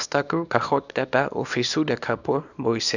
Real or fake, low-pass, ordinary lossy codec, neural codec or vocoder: fake; 7.2 kHz; none; codec, 24 kHz, 0.9 kbps, WavTokenizer, small release